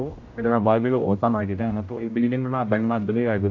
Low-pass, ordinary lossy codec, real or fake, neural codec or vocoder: 7.2 kHz; AAC, 48 kbps; fake; codec, 16 kHz, 0.5 kbps, X-Codec, HuBERT features, trained on general audio